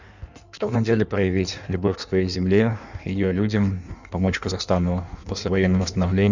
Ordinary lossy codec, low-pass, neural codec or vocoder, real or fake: none; 7.2 kHz; codec, 16 kHz in and 24 kHz out, 1.1 kbps, FireRedTTS-2 codec; fake